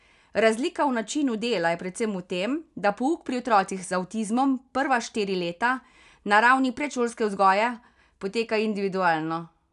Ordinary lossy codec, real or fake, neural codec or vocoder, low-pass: none; real; none; 10.8 kHz